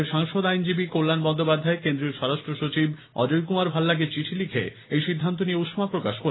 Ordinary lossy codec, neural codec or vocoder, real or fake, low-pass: AAC, 16 kbps; none; real; 7.2 kHz